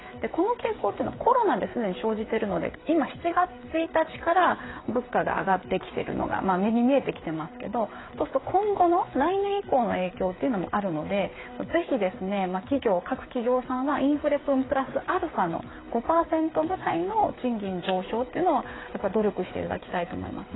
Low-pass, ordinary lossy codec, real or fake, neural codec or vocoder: 7.2 kHz; AAC, 16 kbps; fake; codec, 16 kHz, 8 kbps, FreqCodec, smaller model